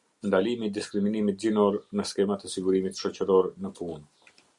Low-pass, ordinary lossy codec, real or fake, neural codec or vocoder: 10.8 kHz; Opus, 64 kbps; real; none